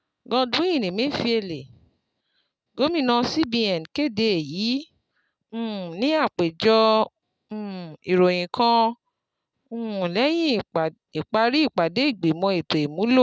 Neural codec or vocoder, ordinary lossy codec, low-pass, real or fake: none; none; none; real